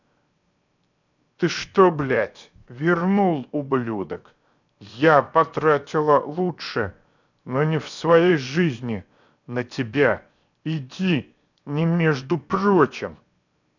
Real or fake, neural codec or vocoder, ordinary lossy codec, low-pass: fake; codec, 16 kHz, 0.7 kbps, FocalCodec; none; 7.2 kHz